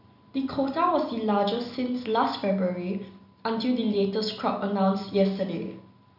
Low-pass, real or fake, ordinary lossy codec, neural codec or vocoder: 5.4 kHz; real; none; none